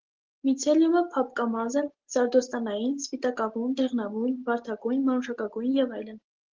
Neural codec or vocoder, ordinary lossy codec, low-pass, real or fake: none; Opus, 16 kbps; 7.2 kHz; real